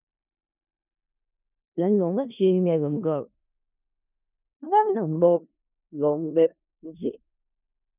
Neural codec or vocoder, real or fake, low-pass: codec, 16 kHz in and 24 kHz out, 0.4 kbps, LongCat-Audio-Codec, four codebook decoder; fake; 3.6 kHz